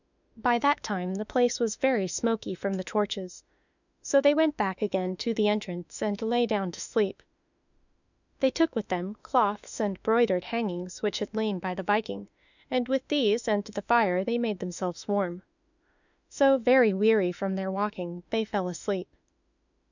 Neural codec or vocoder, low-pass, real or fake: autoencoder, 48 kHz, 32 numbers a frame, DAC-VAE, trained on Japanese speech; 7.2 kHz; fake